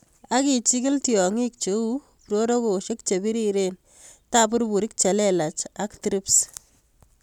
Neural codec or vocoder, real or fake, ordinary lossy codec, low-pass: none; real; none; 19.8 kHz